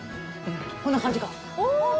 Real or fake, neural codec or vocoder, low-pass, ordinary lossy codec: real; none; none; none